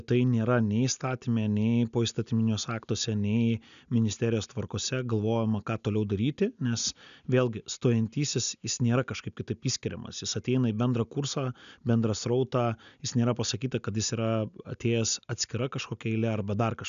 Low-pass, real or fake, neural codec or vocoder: 7.2 kHz; real; none